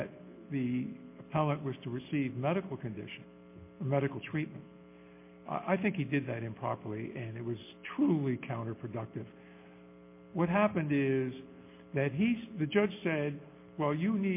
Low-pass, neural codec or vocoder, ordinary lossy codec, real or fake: 3.6 kHz; none; MP3, 24 kbps; real